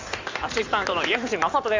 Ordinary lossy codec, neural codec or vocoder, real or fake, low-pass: AAC, 48 kbps; codec, 16 kHz in and 24 kHz out, 2.2 kbps, FireRedTTS-2 codec; fake; 7.2 kHz